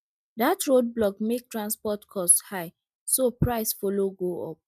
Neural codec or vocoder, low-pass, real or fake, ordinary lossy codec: none; 14.4 kHz; real; none